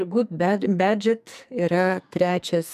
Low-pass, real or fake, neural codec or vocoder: 14.4 kHz; fake; codec, 44.1 kHz, 2.6 kbps, SNAC